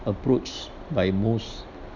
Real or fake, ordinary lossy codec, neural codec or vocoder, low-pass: real; none; none; 7.2 kHz